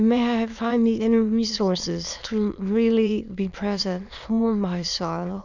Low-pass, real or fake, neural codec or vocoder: 7.2 kHz; fake; autoencoder, 22.05 kHz, a latent of 192 numbers a frame, VITS, trained on many speakers